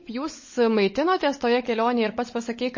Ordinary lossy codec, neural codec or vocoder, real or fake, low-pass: MP3, 32 kbps; codec, 16 kHz, 16 kbps, FunCodec, trained on LibriTTS, 50 frames a second; fake; 7.2 kHz